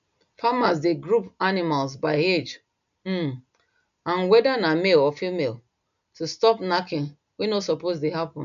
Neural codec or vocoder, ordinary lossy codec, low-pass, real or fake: none; none; 7.2 kHz; real